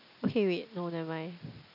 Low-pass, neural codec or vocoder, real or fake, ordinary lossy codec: 5.4 kHz; none; real; none